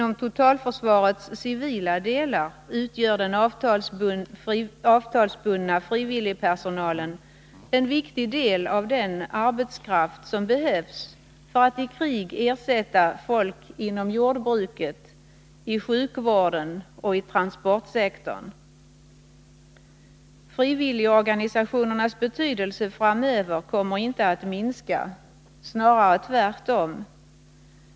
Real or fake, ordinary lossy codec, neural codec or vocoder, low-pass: real; none; none; none